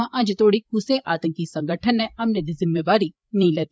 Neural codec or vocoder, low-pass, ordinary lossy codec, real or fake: codec, 16 kHz, 8 kbps, FreqCodec, larger model; none; none; fake